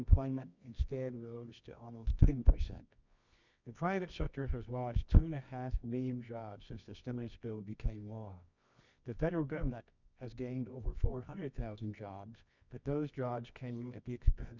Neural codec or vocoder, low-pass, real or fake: codec, 24 kHz, 0.9 kbps, WavTokenizer, medium music audio release; 7.2 kHz; fake